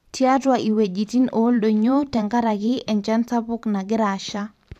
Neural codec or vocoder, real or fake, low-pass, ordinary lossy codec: vocoder, 44.1 kHz, 128 mel bands every 512 samples, BigVGAN v2; fake; 14.4 kHz; none